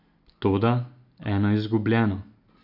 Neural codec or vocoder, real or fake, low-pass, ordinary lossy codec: none; real; 5.4 kHz; none